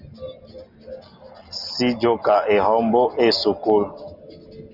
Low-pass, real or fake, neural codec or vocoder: 5.4 kHz; real; none